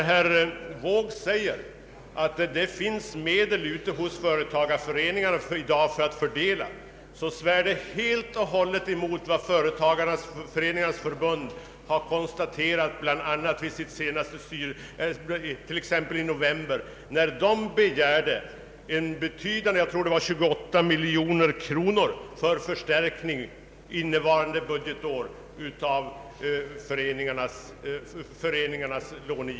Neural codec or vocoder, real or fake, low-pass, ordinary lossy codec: none; real; none; none